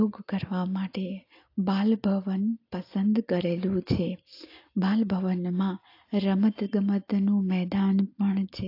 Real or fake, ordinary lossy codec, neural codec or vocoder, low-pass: real; AAC, 32 kbps; none; 5.4 kHz